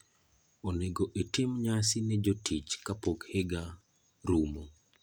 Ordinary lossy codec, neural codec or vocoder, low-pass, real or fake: none; none; none; real